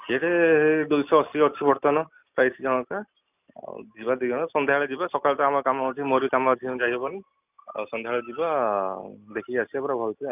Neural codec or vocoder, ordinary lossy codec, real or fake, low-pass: none; none; real; 3.6 kHz